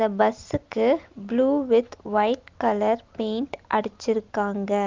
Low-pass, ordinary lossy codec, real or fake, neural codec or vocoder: 7.2 kHz; Opus, 32 kbps; real; none